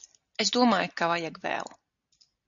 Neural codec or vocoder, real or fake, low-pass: none; real; 7.2 kHz